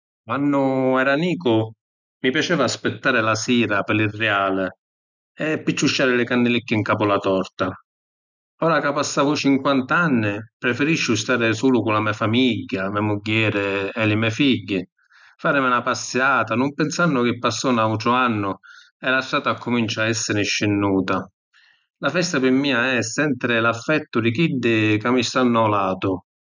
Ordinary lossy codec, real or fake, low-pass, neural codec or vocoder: none; real; 7.2 kHz; none